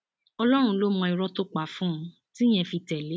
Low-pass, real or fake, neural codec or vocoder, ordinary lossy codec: none; real; none; none